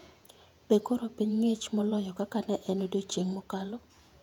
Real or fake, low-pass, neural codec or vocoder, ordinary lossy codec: fake; 19.8 kHz; vocoder, 48 kHz, 128 mel bands, Vocos; none